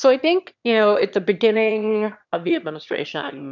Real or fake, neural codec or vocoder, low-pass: fake; autoencoder, 22.05 kHz, a latent of 192 numbers a frame, VITS, trained on one speaker; 7.2 kHz